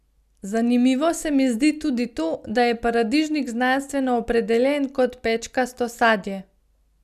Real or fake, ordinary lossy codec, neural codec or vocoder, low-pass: real; none; none; 14.4 kHz